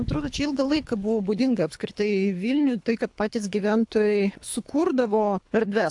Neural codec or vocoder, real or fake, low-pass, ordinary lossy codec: codec, 24 kHz, 3 kbps, HILCodec; fake; 10.8 kHz; AAC, 64 kbps